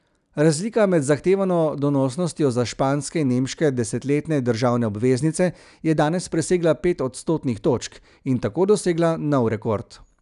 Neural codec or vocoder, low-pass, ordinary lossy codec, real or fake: none; 10.8 kHz; none; real